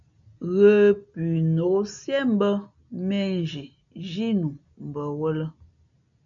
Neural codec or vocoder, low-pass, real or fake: none; 7.2 kHz; real